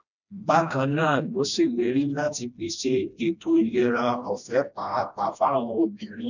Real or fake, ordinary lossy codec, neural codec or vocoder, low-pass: fake; none; codec, 16 kHz, 1 kbps, FreqCodec, smaller model; 7.2 kHz